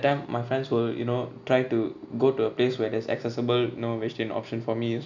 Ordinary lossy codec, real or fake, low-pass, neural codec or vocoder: none; real; 7.2 kHz; none